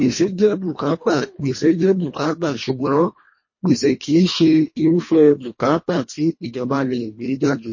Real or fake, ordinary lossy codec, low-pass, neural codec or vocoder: fake; MP3, 32 kbps; 7.2 kHz; codec, 24 kHz, 1.5 kbps, HILCodec